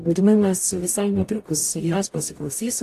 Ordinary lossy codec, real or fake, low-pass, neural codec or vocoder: AAC, 64 kbps; fake; 14.4 kHz; codec, 44.1 kHz, 0.9 kbps, DAC